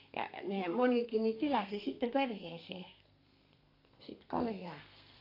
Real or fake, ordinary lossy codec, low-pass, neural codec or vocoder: fake; AAC, 32 kbps; 5.4 kHz; codec, 32 kHz, 1.9 kbps, SNAC